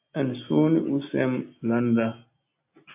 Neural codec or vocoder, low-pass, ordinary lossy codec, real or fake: none; 3.6 kHz; AAC, 32 kbps; real